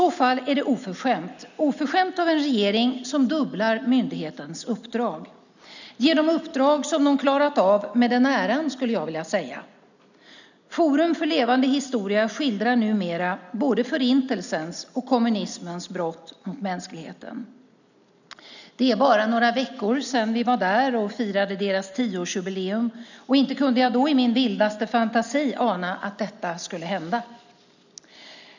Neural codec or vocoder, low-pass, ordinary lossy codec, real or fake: none; 7.2 kHz; none; real